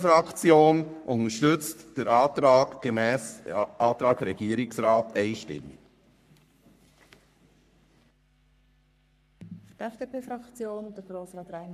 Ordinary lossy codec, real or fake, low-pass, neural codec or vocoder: none; fake; 14.4 kHz; codec, 44.1 kHz, 3.4 kbps, Pupu-Codec